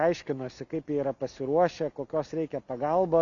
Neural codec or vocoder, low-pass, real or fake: none; 7.2 kHz; real